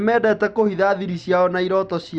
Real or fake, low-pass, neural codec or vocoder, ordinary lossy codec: real; 9.9 kHz; none; Opus, 64 kbps